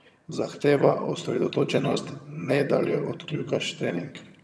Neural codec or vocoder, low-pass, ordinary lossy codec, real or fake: vocoder, 22.05 kHz, 80 mel bands, HiFi-GAN; none; none; fake